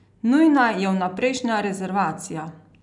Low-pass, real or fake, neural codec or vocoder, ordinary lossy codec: 10.8 kHz; real; none; none